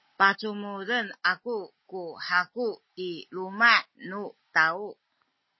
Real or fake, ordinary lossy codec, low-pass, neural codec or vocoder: real; MP3, 24 kbps; 7.2 kHz; none